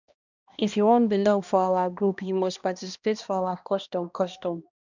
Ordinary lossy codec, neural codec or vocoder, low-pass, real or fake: none; codec, 16 kHz, 1 kbps, X-Codec, HuBERT features, trained on balanced general audio; 7.2 kHz; fake